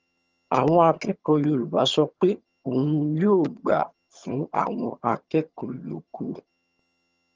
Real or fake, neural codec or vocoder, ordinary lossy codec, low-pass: fake; vocoder, 22.05 kHz, 80 mel bands, HiFi-GAN; Opus, 24 kbps; 7.2 kHz